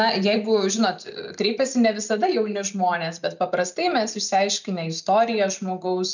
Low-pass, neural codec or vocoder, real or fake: 7.2 kHz; none; real